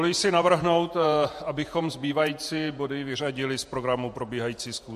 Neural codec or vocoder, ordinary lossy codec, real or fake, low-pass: vocoder, 48 kHz, 128 mel bands, Vocos; MP3, 64 kbps; fake; 14.4 kHz